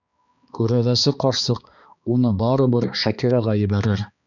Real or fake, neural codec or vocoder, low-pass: fake; codec, 16 kHz, 2 kbps, X-Codec, HuBERT features, trained on balanced general audio; 7.2 kHz